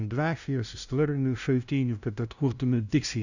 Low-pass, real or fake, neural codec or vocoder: 7.2 kHz; fake; codec, 16 kHz, 0.5 kbps, FunCodec, trained on LibriTTS, 25 frames a second